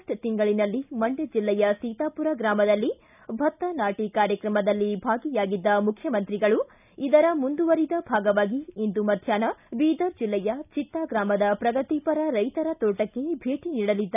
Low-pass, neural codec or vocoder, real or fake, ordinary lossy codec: 3.6 kHz; none; real; none